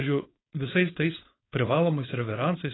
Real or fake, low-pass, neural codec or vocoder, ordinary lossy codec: fake; 7.2 kHz; codec, 16 kHz, 4.8 kbps, FACodec; AAC, 16 kbps